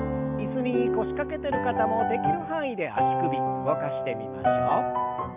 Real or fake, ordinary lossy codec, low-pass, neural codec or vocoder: real; none; 3.6 kHz; none